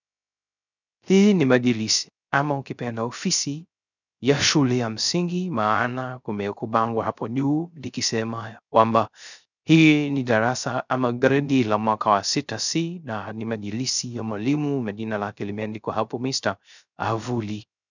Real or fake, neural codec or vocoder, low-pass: fake; codec, 16 kHz, 0.3 kbps, FocalCodec; 7.2 kHz